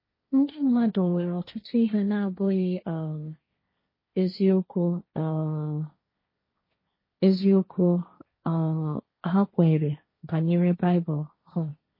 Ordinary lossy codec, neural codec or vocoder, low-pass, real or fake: MP3, 24 kbps; codec, 16 kHz, 1.1 kbps, Voila-Tokenizer; 5.4 kHz; fake